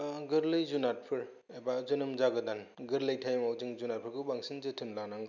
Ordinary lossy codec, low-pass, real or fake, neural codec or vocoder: none; 7.2 kHz; real; none